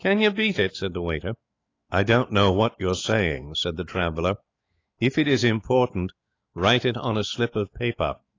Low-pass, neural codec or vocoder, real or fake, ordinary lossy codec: 7.2 kHz; autoencoder, 48 kHz, 128 numbers a frame, DAC-VAE, trained on Japanese speech; fake; AAC, 32 kbps